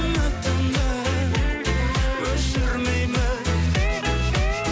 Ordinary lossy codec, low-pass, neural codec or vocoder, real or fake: none; none; none; real